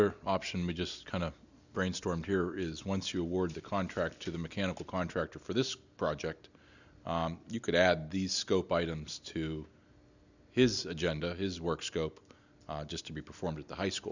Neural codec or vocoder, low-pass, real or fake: none; 7.2 kHz; real